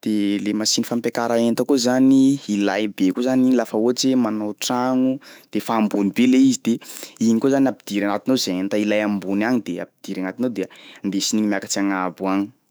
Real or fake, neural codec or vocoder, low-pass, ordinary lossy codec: fake; autoencoder, 48 kHz, 128 numbers a frame, DAC-VAE, trained on Japanese speech; none; none